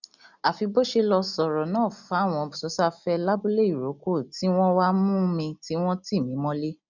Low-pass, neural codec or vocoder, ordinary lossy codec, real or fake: 7.2 kHz; none; none; real